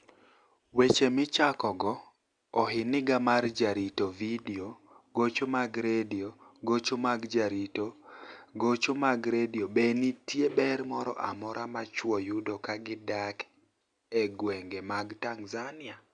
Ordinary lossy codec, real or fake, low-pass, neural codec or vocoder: Opus, 64 kbps; real; 9.9 kHz; none